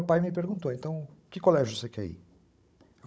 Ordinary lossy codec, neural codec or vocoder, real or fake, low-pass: none; codec, 16 kHz, 16 kbps, FunCodec, trained on Chinese and English, 50 frames a second; fake; none